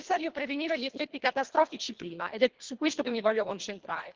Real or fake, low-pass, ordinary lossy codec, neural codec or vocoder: fake; 7.2 kHz; Opus, 16 kbps; codec, 24 kHz, 1.5 kbps, HILCodec